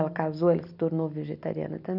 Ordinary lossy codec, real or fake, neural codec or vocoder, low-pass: none; real; none; 5.4 kHz